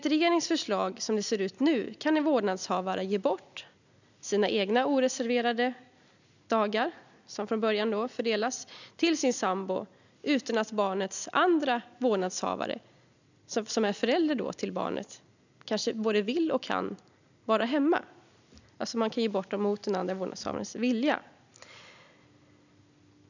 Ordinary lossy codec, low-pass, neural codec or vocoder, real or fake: none; 7.2 kHz; none; real